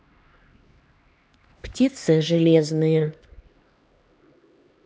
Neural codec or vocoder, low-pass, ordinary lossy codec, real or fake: codec, 16 kHz, 2 kbps, X-Codec, HuBERT features, trained on LibriSpeech; none; none; fake